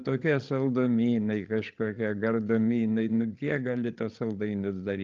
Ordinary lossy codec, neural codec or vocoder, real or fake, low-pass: Opus, 24 kbps; codec, 16 kHz, 8 kbps, FreqCodec, larger model; fake; 7.2 kHz